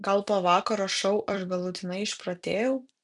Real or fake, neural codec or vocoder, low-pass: fake; vocoder, 44.1 kHz, 128 mel bands every 256 samples, BigVGAN v2; 10.8 kHz